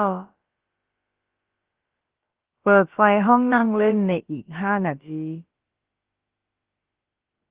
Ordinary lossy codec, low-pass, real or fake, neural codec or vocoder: Opus, 16 kbps; 3.6 kHz; fake; codec, 16 kHz, about 1 kbps, DyCAST, with the encoder's durations